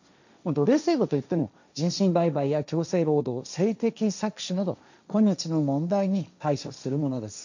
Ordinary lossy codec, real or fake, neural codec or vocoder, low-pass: none; fake; codec, 16 kHz, 1.1 kbps, Voila-Tokenizer; 7.2 kHz